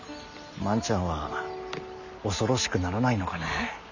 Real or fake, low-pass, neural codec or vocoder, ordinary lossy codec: real; 7.2 kHz; none; none